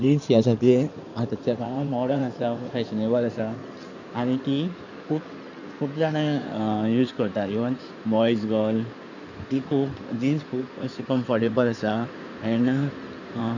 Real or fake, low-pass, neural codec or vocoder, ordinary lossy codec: fake; 7.2 kHz; codec, 16 kHz in and 24 kHz out, 2.2 kbps, FireRedTTS-2 codec; none